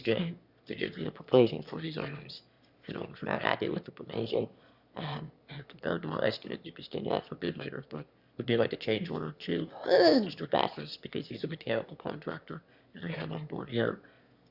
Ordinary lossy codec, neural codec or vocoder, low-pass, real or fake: Opus, 64 kbps; autoencoder, 22.05 kHz, a latent of 192 numbers a frame, VITS, trained on one speaker; 5.4 kHz; fake